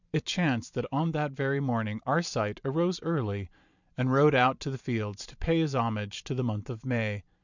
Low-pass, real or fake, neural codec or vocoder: 7.2 kHz; fake; vocoder, 44.1 kHz, 128 mel bands every 512 samples, BigVGAN v2